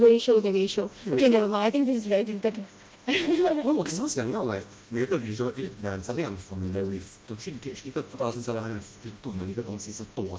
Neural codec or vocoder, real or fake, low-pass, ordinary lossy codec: codec, 16 kHz, 1 kbps, FreqCodec, smaller model; fake; none; none